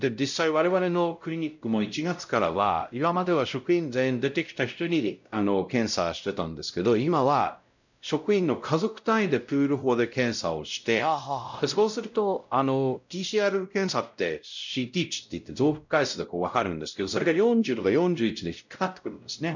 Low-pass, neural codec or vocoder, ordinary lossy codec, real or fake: 7.2 kHz; codec, 16 kHz, 0.5 kbps, X-Codec, WavLM features, trained on Multilingual LibriSpeech; none; fake